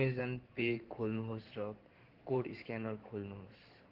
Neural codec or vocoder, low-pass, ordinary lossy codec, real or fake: codec, 16 kHz in and 24 kHz out, 2.2 kbps, FireRedTTS-2 codec; 5.4 kHz; Opus, 16 kbps; fake